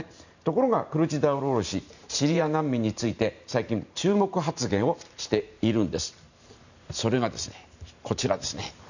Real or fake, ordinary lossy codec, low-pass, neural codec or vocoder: fake; none; 7.2 kHz; vocoder, 44.1 kHz, 128 mel bands every 512 samples, BigVGAN v2